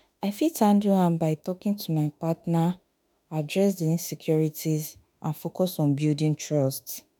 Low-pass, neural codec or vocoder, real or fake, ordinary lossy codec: none; autoencoder, 48 kHz, 32 numbers a frame, DAC-VAE, trained on Japanese speech; fake; none